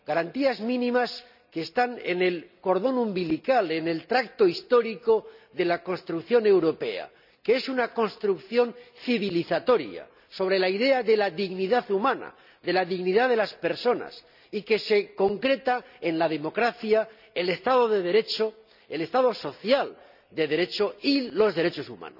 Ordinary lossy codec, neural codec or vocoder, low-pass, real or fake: none; none; 5.4 kHz; real